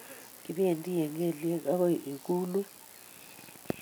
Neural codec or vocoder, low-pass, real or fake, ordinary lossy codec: none; none; real; none